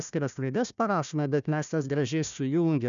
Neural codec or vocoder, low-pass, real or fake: codec, 16 kHz, 1 kbps, FunCodec, trained on Chinese and English, 50 frames a second; 7.2 kHz; fake